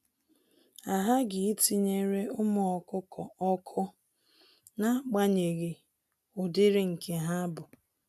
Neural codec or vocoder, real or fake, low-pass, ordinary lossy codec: none; real; 14.4 kHz; none